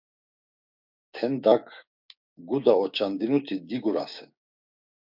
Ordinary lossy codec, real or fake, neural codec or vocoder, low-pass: MP3, 48 kbps; fake; codec, 16 kHz, 6 kbps, DAC; 5.4 kHz